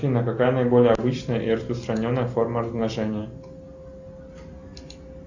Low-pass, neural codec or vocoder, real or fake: 7.2 kHz; none; real